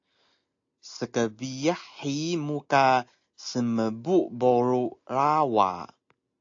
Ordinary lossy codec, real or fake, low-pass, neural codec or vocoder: AAC, 48 kbps; real; 7.2 kHz; none